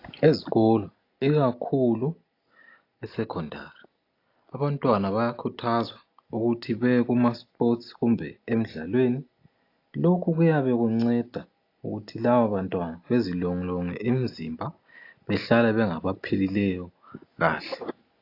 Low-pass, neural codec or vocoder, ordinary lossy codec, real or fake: 5.4 kHz; none; AAC, 32 kbps; real